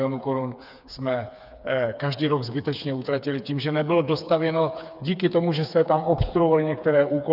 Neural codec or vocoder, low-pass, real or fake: codec, 16 kHz, 4 kbps, FreqCodec, smaller model; 5.4 kHz; fake